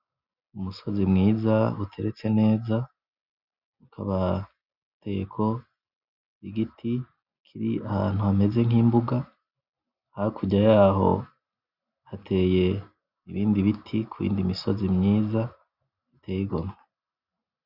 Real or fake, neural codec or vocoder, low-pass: real; none; 5.4 kHz